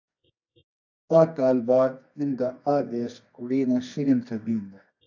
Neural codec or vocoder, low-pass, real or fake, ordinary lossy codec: codec, 24 kHz, 0.9 kbps, WavTokenizer, medium music audio release; 7.2 kHz; fake; AAC, 48 kbps